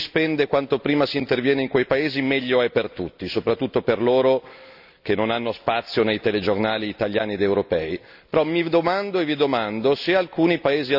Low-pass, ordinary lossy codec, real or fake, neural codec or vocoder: 5.4 kHz; none; real; none